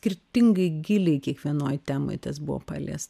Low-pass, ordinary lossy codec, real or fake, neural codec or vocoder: 14.4 kHz; MP3, 96 kbps; real; none